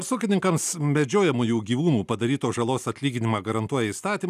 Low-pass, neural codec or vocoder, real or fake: 14.4 kHz; none; real